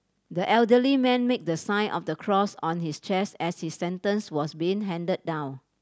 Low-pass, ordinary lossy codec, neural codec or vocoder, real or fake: none; none; none; real